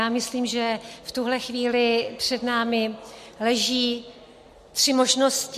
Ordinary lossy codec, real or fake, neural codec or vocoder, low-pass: MP3, 64 kbps; real; none; 14.4 kHz